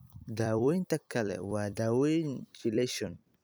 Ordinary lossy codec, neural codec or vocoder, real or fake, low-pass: none; vocoder, 44.1 kHz, 128 mel bands every 256 samples, BigVGAN v2; fake; none